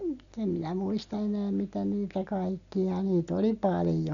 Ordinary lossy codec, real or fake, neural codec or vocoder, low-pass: none; real; none; 7.2 kHz